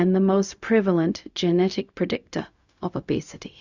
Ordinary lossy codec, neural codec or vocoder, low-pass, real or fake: Opus, 64 kbps; codec, 16 kHz, 0.4 kbps, LongCat-Audio-Codec; 7.2 kHz; fake